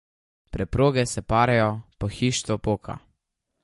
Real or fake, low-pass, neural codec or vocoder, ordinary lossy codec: real; 14.4 kHz; none; MP3, 48 kbps